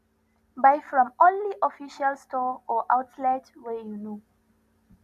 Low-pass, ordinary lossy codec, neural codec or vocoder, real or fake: 14.4 kHz; none; none; real